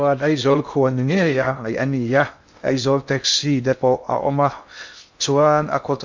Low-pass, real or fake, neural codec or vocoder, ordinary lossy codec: 7.2 kHz; fake; codec, 16 kHz in and 24 kHz out, 0.6 kbps, FocalCodec, streaming, 2048 codes; MP3, 48 kbps